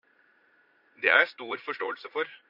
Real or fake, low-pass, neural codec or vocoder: fake; 5.4 kHz; vocoder, 44.1 kHz, 80 mel bands, Vocos